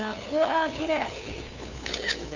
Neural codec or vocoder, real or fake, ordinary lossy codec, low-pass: codec, 16 kHz, 2 kbps, FunCodec, trained on LibriTTS, 25 frames a second; fake; none; 7.2 kHz